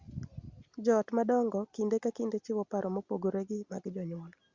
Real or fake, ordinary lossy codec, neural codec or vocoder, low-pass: real; Opus, 64 kbps; none; 7.2 kHz